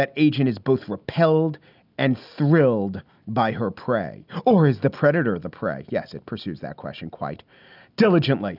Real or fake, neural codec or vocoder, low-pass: real; none; 5.4 kHz